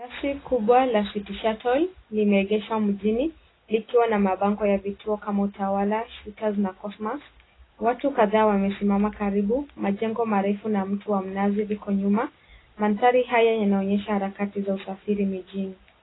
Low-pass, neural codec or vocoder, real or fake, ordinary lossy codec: 7.2 kHz; none; real; AAC, 16 kbps